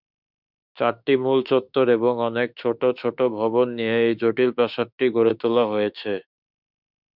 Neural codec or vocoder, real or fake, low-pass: autoencoder, 48 kHz, 32 numbers a frame, DAC-VAE, trained on Japanese speech; fake; 5.4 kHz